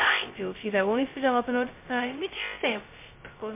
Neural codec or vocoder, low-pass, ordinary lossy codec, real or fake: codec, 16 kHz, 0.2 kbps, FocalCodec; 3.6 kHz; MP3, 24 kbps; fake